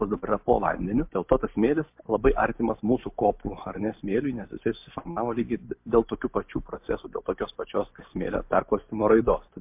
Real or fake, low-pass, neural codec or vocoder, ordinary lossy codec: real; 3.6 kHz; none; MP3, 32 kbps